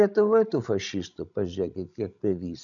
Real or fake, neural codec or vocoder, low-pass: fake; codec, 16 kHz, 16 kbps, FreqCodec, larger model; 7.2 kHz